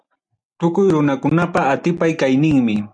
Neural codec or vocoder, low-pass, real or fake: none; 9.9 kHz; real